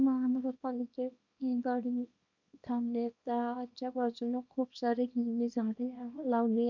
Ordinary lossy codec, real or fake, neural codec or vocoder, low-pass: none; fake; codec, 24 kHz, 0.9 kbps, WavTokenizer, small release; 7.2 kHz